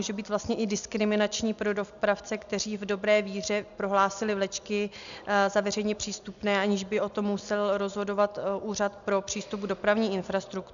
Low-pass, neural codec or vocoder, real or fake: 7.2 kHz; none; real